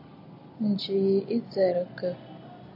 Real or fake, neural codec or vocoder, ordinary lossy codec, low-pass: real; none; AAC, 32 kbps; 5.4 kHz